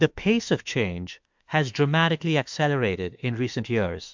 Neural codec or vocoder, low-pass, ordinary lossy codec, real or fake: autoencoder, 48 kHz, 32 numbers a frame, DAC-VAE, trained on Japanese speech; 7.2 kHz; MP3, 64 kbps; fake